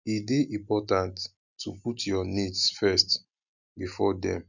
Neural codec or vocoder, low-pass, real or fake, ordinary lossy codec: none; 7.2 kHz; real; none